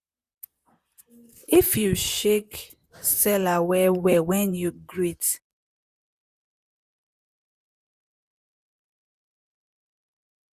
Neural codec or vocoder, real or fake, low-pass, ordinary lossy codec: vocoder, 44.1 kHz, 128 mel bands, Pupu-Vocoder; fake; 14.4 kHz; Opus, 64 kbps